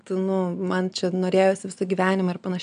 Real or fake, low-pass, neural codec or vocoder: real; 9.9 kHz; none